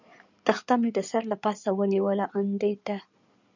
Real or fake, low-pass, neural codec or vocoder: fake; 7.2 kHz; codec, 16 kHz in and 24 kHz out, 2.2 kbps, FireRedTTS-2 codec